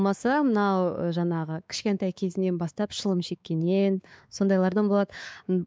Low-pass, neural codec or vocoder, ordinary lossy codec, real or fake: none; codec, 16 kHz, 4 kbps, X-Codec, WavLM features, trained on Multilingual LibriSpeech; none; fake